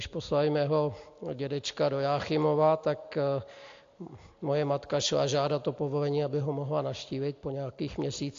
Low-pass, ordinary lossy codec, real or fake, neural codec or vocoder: 7.2 kHz; AAC, 48 kbps; real; none